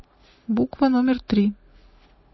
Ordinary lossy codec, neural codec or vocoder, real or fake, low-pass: MP3, 24 kbps; none; real; 7.2 kHz